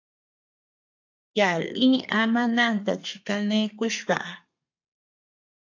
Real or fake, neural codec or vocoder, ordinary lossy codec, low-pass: fake; codec, 32 kHz, 1.9 kbps, SNAC; AAC, 48 kbps; 7.2 kHz